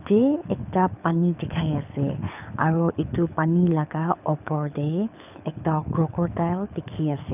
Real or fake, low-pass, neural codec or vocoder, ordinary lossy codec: fake; 3.6 kHz; codec, 24 kHz, 6 kbps, HILCodec; none